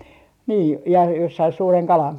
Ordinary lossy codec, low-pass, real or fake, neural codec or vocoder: none; 19.8 kHz; real; none